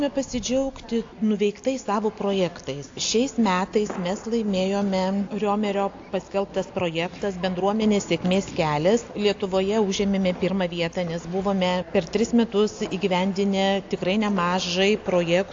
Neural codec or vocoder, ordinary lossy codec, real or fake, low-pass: none; AAC, 48 kbps; real; 7.2 kHz